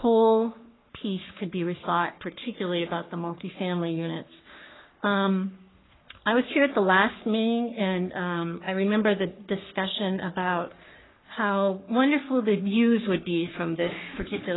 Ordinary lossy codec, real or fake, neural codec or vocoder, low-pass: AAC, 16 kbps; fake; codec, 44.1 kHz, 3.4 kbps, Pupu-Codec; 7.2 kHz